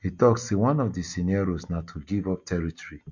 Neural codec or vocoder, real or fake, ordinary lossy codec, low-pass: none; real; MP3, 48 kbps; 7.2 kHz